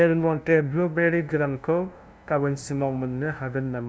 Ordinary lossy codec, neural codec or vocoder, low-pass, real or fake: none; codec, 16 kHz, 0.5 kbps, FunCodec, trained on LibriTTS, 25 frames a second; none; fake